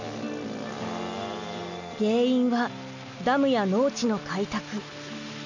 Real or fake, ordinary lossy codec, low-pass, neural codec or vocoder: real; none; 7.2 kHz; none